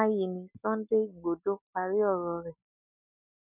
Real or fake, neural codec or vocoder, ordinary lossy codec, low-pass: real; none; none; 3.6 kHz